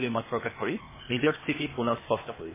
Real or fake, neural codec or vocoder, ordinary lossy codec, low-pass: fake; codec, 16 kHz, 0.8 kbps, ZipCodec; MP3, 16 kbps; 3.6 kHz